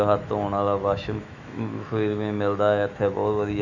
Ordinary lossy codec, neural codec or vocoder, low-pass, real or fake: none; autoencoder, 48 kHz, 128 numbers a frame, DAC-VAE, trained on Japanese speech; 7.2 kHz; fake